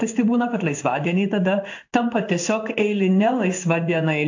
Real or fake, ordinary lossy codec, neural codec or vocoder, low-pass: fake; AAC, 48 kbps; codec, 16 kHz in and 24 kHz out, 1 kbps, XY-Tokenizer; 7.2 kHz